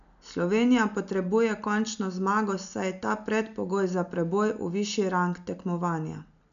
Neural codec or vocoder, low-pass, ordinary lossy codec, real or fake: none; 7.2 kHz; none; real